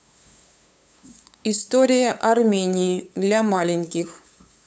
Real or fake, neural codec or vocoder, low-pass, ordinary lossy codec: fake; codec, 16 kHz, 8 kbps, FunCodec, trained on LibriTTS, 25 frames a second; none; none